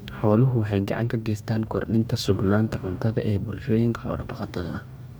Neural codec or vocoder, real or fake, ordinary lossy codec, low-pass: codec, 44.1 kHz, 2.6 kbps, DAC; fake; none; none